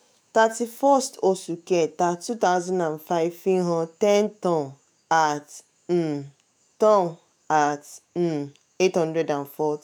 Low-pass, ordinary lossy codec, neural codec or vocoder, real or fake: none; none; autoencoder, 48 kHz, 128 numbers a frame, DAC-VAE, trained on Japanese speech; fake